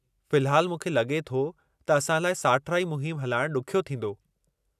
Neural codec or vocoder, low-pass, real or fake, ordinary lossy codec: none; 14.4 kHz; real; none